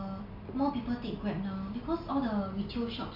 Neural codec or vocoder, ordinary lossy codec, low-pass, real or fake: none; none; 5.4 kHz; real